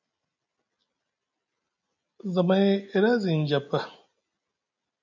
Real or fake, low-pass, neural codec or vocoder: real; 7.2 kHz; none